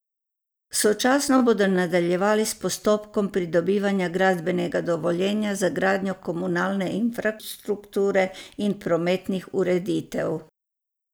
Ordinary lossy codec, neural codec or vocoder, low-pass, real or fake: none; none; none; real